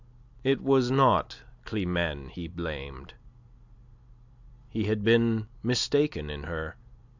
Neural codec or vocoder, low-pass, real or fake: none; 7.2 kHz; real